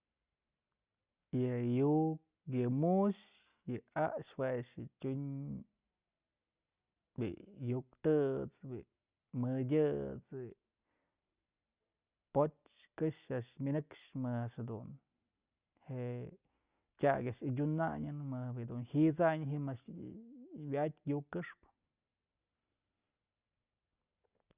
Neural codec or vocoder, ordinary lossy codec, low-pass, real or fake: none; Opus, 64 kbps; 3.6 kHz; real